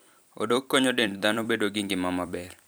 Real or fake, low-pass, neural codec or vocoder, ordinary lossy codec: fake; none; vocoder, 44.1 kHz, 128 mel bands every 256 samples, BigVGAN v2; none